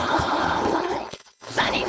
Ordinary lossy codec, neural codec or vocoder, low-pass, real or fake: none; codec, 16 kHz, 4.8 kbps, FACodec; none; fake